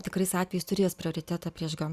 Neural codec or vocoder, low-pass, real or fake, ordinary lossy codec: none; 14.4 kHz; real; Opus, 64 kbps